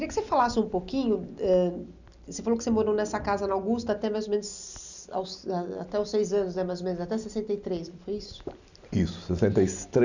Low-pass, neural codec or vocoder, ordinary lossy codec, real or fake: 7.2 kHz; none; none; real